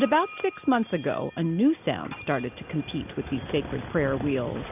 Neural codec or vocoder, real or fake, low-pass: none; real; 3.6 kHz